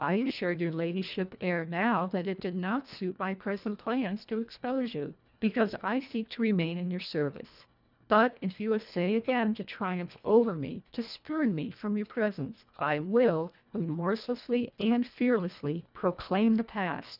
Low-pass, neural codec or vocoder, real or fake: 5.4 kHz; codec, 24 kHz, 1.5 kbps, HILCodec; fake